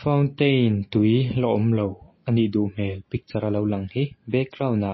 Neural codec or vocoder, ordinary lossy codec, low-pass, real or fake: none; MP3, 24 kbps; 7.2 kHz; real